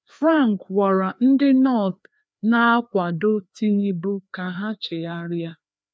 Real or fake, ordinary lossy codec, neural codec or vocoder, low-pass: fake; none; codec, 16 kHz, 2 kbps, FreqCodec, larger model; none